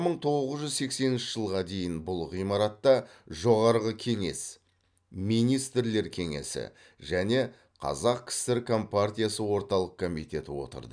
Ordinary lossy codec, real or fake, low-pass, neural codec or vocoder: none; real; 9.9 kHz; none